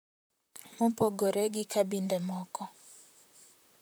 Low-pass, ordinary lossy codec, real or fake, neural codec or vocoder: none; none; fake; vocoder, 44.1 kHz, 128 mel bands, Pupu-Vocoder